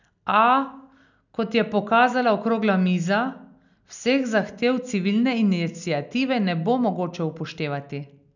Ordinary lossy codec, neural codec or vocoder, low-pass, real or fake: none; none; 7.2 kHz; real